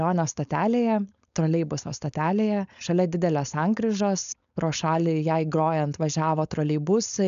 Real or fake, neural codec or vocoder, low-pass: fake; codec, 16 kHz, 4.8 kbps, FACodec; 7.2 kHz